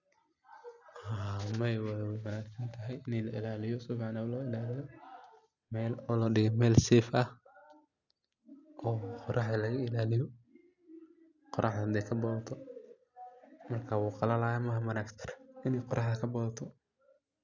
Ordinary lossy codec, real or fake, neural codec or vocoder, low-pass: none; real; none; 7.2 kHz